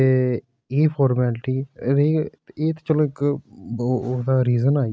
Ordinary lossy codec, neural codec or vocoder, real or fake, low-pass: none; none; real; none